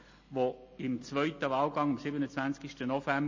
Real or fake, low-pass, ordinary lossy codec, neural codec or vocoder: real; 7.2 kHz; MP3, 32 kbps; none